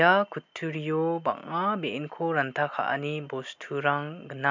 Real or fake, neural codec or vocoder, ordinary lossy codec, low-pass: real; none; MP3, 64 kbps; 7.2 kHz